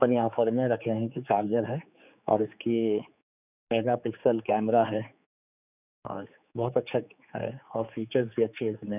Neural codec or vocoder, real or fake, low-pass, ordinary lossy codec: codec, 16 kHz, 4 kbps, X-Codec, HuBERT features, trained on general audio; fake; 3.6 kHz; none